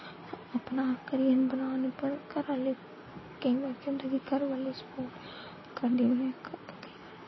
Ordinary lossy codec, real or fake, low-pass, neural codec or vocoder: MP3, 24 kbps; real; 7.2 kHz; none